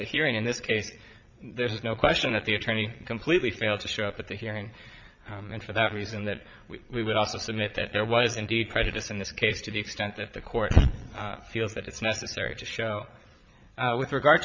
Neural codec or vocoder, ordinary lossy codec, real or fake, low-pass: vocoder, 22.05 kHz, 80 mel bands, Vocos; MP3, 64 kbps; fake; 7.2 kHz